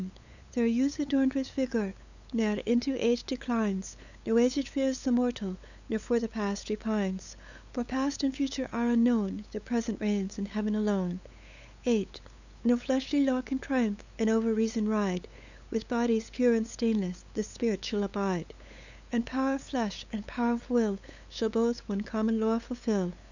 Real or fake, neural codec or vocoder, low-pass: fake; codec, 16 kHz, 8 kbps, FunCodec, trained on LibriTTS, 25 frames a second; 7.2 kHz